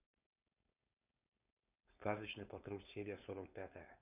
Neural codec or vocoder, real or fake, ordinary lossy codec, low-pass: codec, 16 kHz, 4.8 kbps, FACodec; fake; none; 3.6 kHz